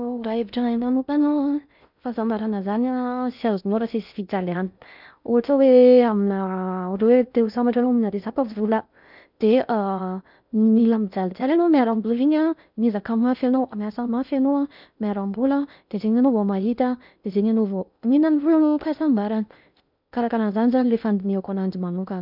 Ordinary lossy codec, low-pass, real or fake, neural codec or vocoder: none; 5.4 kHz; fake; codec, 16 kHz in and 24 kHz out, 0.6 kbps, FocalCodec, streaming, 4096 codes